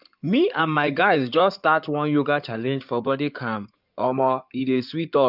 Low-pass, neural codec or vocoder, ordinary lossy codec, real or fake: 5.4 kHz; codec, 16 kHz in and 24 kHz out, 2.2 kbps, FireRedTTS-2 codec; none; fake